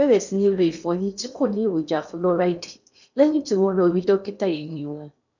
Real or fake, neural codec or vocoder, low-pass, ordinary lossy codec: fake; codec, 16 kHz in and 24 kHz out, 0.8 kbps, FocalCodec, streaming, 65536 codes; 7.2 kHz; none